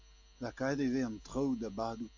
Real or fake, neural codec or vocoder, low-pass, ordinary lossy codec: real; none; 7.2 kHz; AAC, 48 kbps